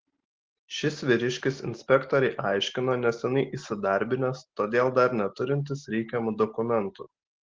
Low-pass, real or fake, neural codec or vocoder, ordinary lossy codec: 7.2 kHz; real; none; Opus, 16 kbps